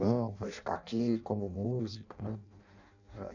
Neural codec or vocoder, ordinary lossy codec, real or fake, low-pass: codec, 16 kHz in and 24 kHz out, 0.6 kbps, FireRedTTS-2 codec; none; fake; 7.2 kHz